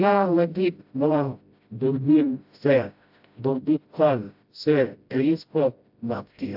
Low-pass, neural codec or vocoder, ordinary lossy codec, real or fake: 5.4 kHz; codec, 16 kHz, 0.5 kbps, FreqCodec, smaller model; AAC, 48 kbps; fake